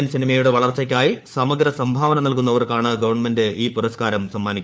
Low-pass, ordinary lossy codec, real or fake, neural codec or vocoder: none; none; fake; codec, 16 kHz, 4 kbps, FunCodec, trained on LibriTTS, 50 frames a second